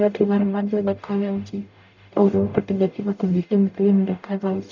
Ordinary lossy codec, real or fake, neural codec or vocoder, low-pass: none; fake; codec, 44.1 kHz, 0.9 kbps, DAC; 7.2 kHz